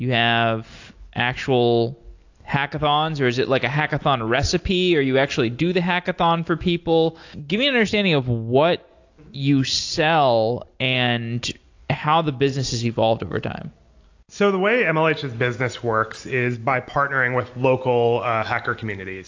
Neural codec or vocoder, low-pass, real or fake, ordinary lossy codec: none; 7.2 kHz; real; AAC, 48 kbps